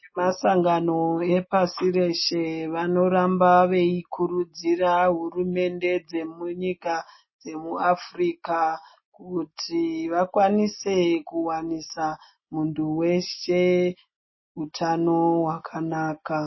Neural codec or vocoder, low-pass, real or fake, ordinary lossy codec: none; 7.2 kHz; real; MP3, 24 kbps